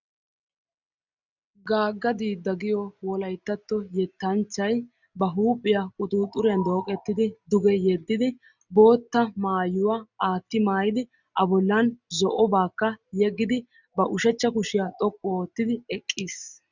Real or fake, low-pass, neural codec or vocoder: real; 7.2 kHz; none